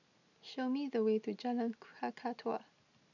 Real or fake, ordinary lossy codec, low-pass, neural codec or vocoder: real; none; 7.2 kHz; none